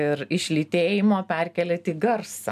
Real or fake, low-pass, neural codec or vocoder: real; 14.4 kHz; none